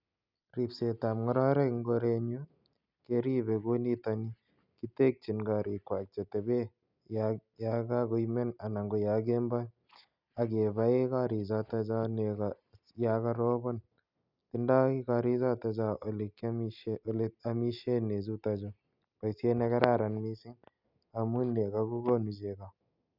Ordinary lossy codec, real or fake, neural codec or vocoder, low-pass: none; real; none; 5.4 kHz